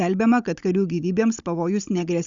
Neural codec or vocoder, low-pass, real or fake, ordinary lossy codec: codec, 16 kHz, 16 kbps, FunCodec, trained on Chinese and English, 50 frames a second; 7.2 kHz; fake; Opus, 64 kbps